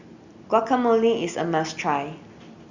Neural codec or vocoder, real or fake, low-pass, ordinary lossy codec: none; real; 7.2 kHz; Opus, 64 kbps